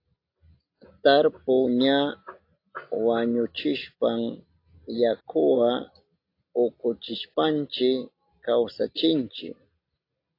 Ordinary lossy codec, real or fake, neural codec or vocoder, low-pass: AAC, 32 kbps; real; none; 5.4 kHz